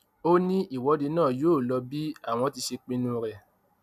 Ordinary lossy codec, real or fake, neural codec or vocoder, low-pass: none; real; none; 14.4 kHz